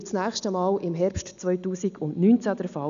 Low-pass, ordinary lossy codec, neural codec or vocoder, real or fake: 7.2 kHz; none; none; real